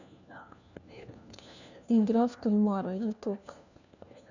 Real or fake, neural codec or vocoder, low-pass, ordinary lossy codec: fake; codec, 16 kHz, 1 kbps, FunCodec, trained on LibriTTS, 50 frames a second; 7.2 kHz; none